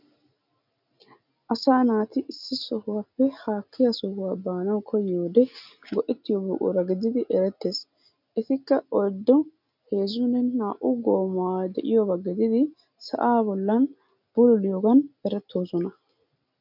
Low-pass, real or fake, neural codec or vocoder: 5.4 kHz; real; none